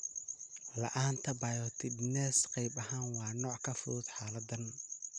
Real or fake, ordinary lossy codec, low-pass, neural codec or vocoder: real; none; 9.9 kHz; none